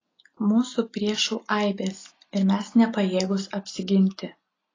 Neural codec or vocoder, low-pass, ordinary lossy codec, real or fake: none; 7.2 kHz; AAC, 32 kbps; real